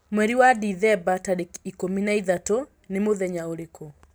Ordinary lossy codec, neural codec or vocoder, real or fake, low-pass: none; none; real; none